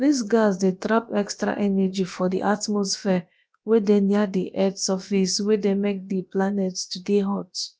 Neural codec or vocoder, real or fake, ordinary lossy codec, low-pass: codec, 16 kHz, about 1 kbps, DyCAST, with the encoder's durations; fake; none; none